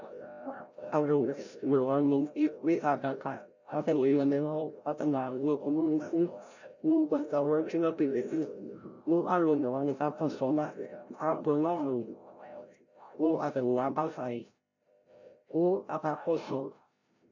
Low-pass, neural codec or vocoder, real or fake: 7.2 kHz; codec, 16 kHz, 0.5 kbps, FreqCodec, larger model; fake